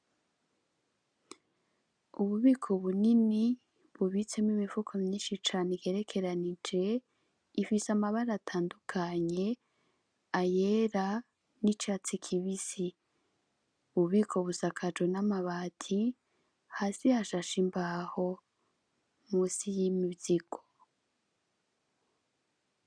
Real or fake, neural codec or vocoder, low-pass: real; none; 9.9 kHz